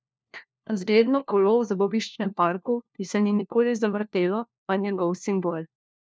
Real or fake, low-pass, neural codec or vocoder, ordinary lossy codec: fake; none; codec, 16 kHz, 1 kbps, FunCodec, trained on LibriTTS, 50 frames a second; none